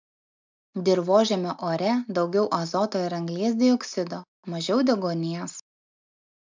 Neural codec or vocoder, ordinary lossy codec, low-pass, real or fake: none; MP3, 64 kbps; 7.2 kHz; real